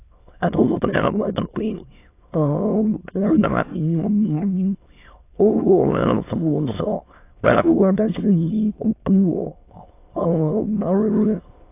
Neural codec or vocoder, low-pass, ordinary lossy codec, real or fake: autoencoder, 22.05 kHz, a latent of 192 numbers a frame, VITS, trained on many speakers; 3.6 kHz; AAC, 24 kbps; fake